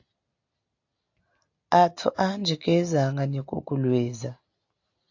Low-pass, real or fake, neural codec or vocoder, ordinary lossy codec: 7.2 kHz; real; none; AAC, 48 kbps